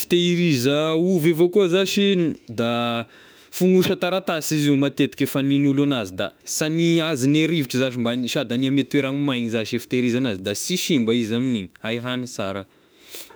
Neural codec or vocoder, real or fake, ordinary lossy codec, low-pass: autoencoder, 48 kHz, 32 numbers a frame, DAC-VAE, trained on Japanese speech; fake; none; none